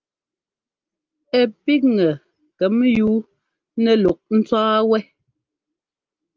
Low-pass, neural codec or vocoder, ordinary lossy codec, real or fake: 7.2 kHz; none; Opus, 32 kbps; real